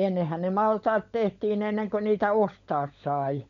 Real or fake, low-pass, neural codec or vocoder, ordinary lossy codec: fake; 7.2 kHz; codec, 16 kHz, 16 kbps, FunCodec, trained on LibriTTS, 50 frames a second; MP3, 64 kbps